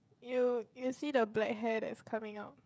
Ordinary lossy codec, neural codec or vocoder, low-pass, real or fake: none; codec, 16 kHz, 16 kbps, FreqCodec, smaller model; none; fake